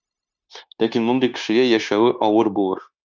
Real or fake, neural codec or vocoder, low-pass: fake; codec, 16 kHz, 0.9 kbps, LongCat-Audio-Codec; 7.2 kHz